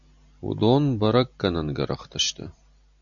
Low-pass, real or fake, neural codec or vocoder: 7.2 kHz; real; none